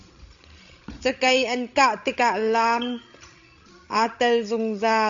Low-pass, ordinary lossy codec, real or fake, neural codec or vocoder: 7.2 kHz; AAC, 64 kbps; fake; codec, 16 kHz, 16 kbps, FreqCodec, larger model